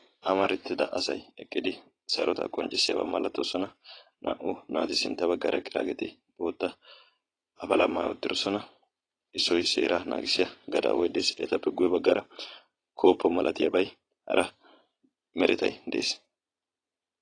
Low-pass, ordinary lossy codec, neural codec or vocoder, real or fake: 9.9 kHz; AAC, 32 kbps; vocoder, 22.05 kHz, 80 mel bands, Vocos; fake